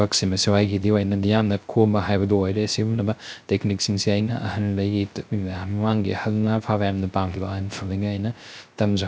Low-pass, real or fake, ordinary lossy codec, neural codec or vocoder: none; fake; none; codec, 16 kHz, 0.3 kbps, FocalCodec